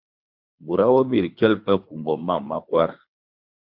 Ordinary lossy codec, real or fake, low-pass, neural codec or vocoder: MP3, 48 kbps; fake; 5.4 kHz; codec, 24 kHz, 3 kbps, HILCodec